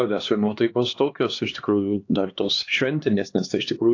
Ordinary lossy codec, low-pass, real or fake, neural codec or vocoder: AAC, 48 kbps; 7.2 kHz; fake; codec, 16 kHz, 2 kbps, X-Codec, HuBERT features, trained on LibriSpeech